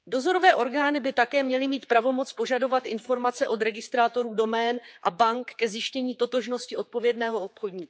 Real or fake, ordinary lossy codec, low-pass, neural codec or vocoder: fake; none; none; codec, 16 kHz, 4 kbps, X-Codec, HuBERT features, trained on general audio